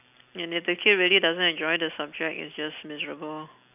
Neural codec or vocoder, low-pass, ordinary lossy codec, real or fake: none; 3.6 kHz; none; real